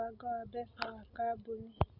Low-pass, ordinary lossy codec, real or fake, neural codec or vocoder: 5.4 kHz; none; real; none